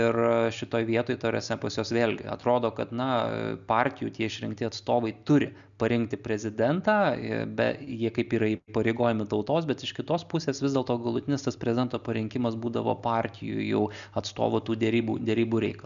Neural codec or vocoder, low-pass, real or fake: none; 7.2 kHz; real